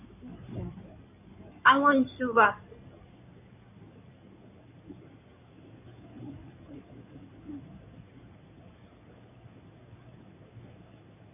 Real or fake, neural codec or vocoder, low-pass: fake; codec, 16 kHz in and 24 kHz out, 2.2 kbps, FireRedTTS-2 codec; 3.6 kHz